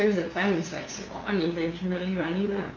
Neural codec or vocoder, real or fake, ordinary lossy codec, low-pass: codec, 16 kHz, 2 kbps, FunCodec, trained on LibriTTS, 25 frames a second; fake; AAC, 32 kbps; 7.2 kHz